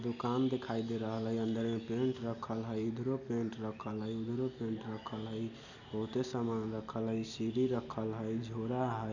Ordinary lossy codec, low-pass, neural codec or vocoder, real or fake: none; 7.2 kHz; none; real